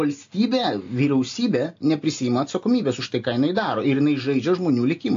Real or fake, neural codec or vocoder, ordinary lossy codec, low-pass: real; none; AAC, 48 kbps; 7.2 kHz